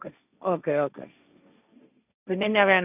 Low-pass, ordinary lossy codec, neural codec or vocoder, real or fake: 3.6 kHz; none; codec, 16 kHz, 1.1 kbps, Voila-Tokenizer; fake